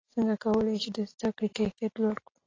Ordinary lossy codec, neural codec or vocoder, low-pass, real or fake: AAC, 32 kbps; none; 7.2 kHz; real